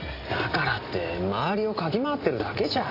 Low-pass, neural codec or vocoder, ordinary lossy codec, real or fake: 5.4 kHz; none; AAC, 32 kbps; real